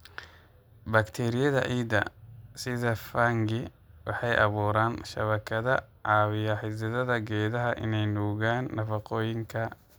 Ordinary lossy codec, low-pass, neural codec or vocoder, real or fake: none; none; none; real